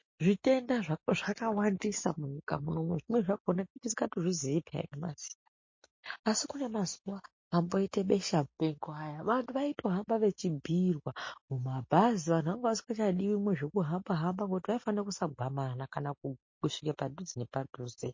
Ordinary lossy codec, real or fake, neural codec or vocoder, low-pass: MP3, 32 kbps; real; none; 7.2 kHz